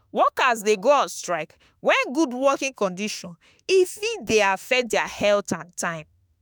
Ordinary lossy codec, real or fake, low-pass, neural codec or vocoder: none; fake; none; autoencoder, 48 kHz, 32 numbers a frame, DAC-VAE, trained on Japanese speech